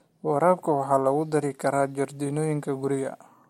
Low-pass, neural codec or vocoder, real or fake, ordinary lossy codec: 19.8 kHz; none; real; MP3, 64 kbps